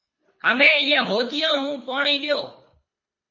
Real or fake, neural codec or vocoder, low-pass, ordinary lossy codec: fake; codec, 24 kHz, 3 kbps, HILCodec; 7.2 kHz; MP3, 32 kbps